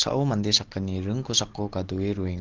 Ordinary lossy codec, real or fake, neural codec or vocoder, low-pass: Opus, 16 kbps; real; none; 7.2 kHz